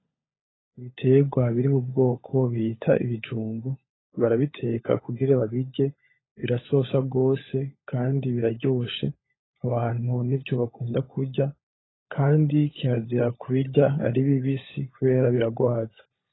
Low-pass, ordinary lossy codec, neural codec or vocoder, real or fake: 7.2 kHz; AAC, 16 kbps; codec, 16 kHz, 16 kbps, FunCodec, trained on LibriTTS, 50 frames a second; fake